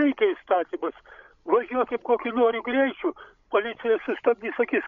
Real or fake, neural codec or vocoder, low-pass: fake; codec, 16 kHz, 16 kbps, FreqCodec, larger model; 7.2 kHz